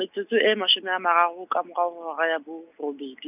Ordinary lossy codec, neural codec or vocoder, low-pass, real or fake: none; none; 3.6 kHz; real